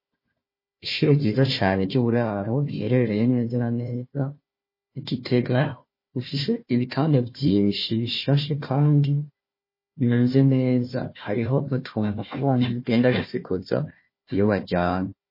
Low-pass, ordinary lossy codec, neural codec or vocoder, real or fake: 5.4 kHz; MP3, 24 kbps; codec, 16 kHz, 1 kbps, FunCodec, trained on Chinese and English, 50 frames a second; fake